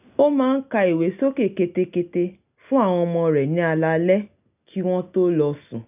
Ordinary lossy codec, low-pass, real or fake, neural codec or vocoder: none; 3.6 kHz; real; none